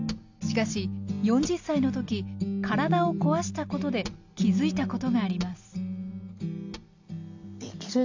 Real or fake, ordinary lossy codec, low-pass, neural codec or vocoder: real; AAC, 48 kbps; 7.2 kHz; none